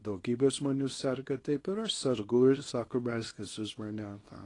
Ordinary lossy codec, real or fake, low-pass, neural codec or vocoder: AAC, 32 kbps; fake; 10.8 kHz; codec, 24 kHz, 0.9 kbps, WavTokenizer, small release